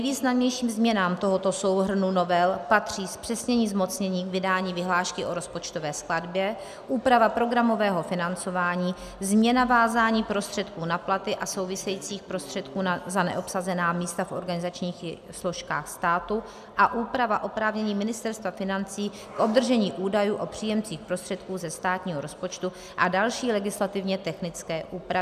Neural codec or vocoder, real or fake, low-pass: none; real; 14.4 kHz